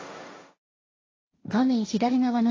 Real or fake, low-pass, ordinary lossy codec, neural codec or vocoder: fake; 7.2 kHz; AAC, 32 kbps; codec, 16 kHz, 1.1 kbps, Voila-Tokenizer